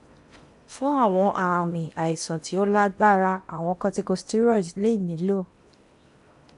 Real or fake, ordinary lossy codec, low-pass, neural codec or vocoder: fake; MP3, 96 kbps; 10.8 kHz; codec, 16 kHz in and 24 kHz out, 0.8 kbps, FocalCodec, streaming, 65536 codes